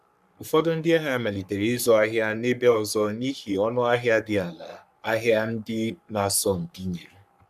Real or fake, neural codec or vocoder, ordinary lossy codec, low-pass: fake; codec, 44.1 kHz, 3.4 kbps, Pupu-Codec; none; 14.4 kHz